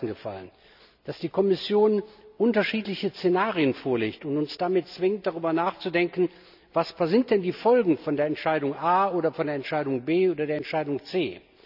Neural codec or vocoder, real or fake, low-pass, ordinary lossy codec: none; real; 5.4 kHz; none